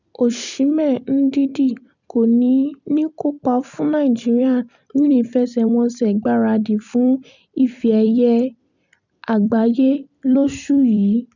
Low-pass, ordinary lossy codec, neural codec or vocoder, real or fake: 7.2 kHz; none; vocoder, 44.1 kHz, 128 mel bands every 256 samples, BigVGAN v2; fake